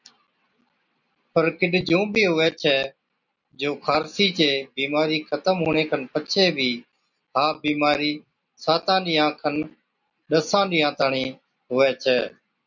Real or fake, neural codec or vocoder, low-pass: real; none; 7.2 kHz